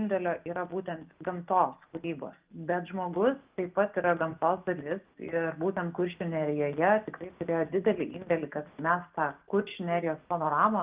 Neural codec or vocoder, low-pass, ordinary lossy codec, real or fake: none; 3.6 kHz; Opus, 24 kbps; real